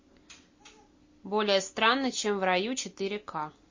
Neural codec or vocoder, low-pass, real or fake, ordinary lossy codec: none; 7.2 kHz; real; MP3, 32 kbps